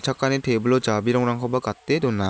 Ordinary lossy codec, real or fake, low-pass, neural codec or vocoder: none; real; none; none